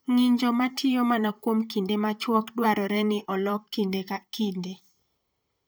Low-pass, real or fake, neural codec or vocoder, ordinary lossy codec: none; fake; vocoder, 44.1 kHz, 128 mel bands, Pupu-Vocoder; none